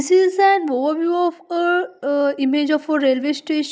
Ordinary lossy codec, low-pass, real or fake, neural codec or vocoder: none; none; real; none